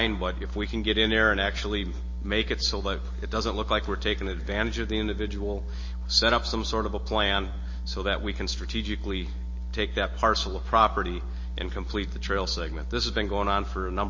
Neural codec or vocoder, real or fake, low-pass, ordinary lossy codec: none; real; 7.2 kHz; MP3, 32 kbps